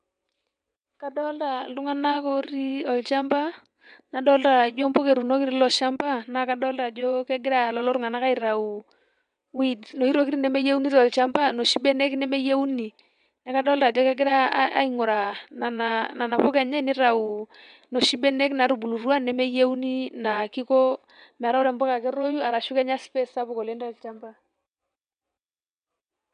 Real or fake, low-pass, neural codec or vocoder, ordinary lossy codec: fake; 9.9 kHz; vocoder, 22.05 kHz, 80 mel bands, WaveNeXt; none